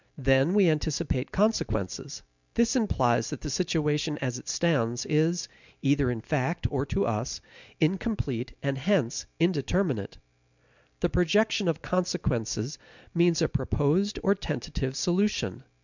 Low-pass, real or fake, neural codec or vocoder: 7.2 kHz; real; none